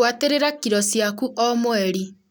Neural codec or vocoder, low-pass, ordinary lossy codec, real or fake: none; none; none; real